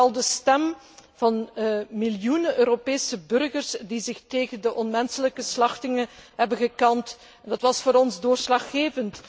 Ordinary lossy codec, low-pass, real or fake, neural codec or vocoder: none; none; real; none